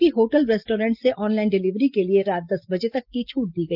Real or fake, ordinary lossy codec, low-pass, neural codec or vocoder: real; Opus, 32 kbps; 5.4 kHz; none